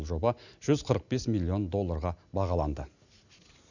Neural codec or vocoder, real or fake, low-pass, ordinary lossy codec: none; real; 7.2 kHz; none